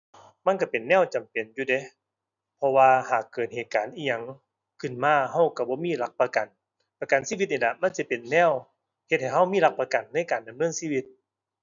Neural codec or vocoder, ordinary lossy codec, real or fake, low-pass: none; none; real; 7.2 kHz